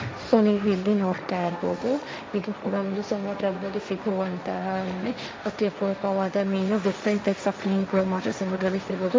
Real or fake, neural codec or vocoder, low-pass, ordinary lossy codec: fake; codec, 16 kHz, 1.1 kbps, Voila-Tokenizer; none; none